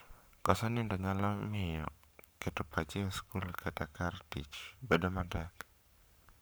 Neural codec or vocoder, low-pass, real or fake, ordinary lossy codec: codec, 44.1 kHz, 7.8 kbps, Pupu-Codec; none; fake; none